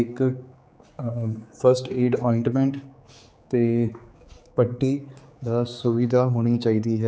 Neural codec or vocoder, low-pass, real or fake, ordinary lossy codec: codec, 16 kHz, 4 kbps, X-Codec, HuBERT features, trained on general audio; none; fake; none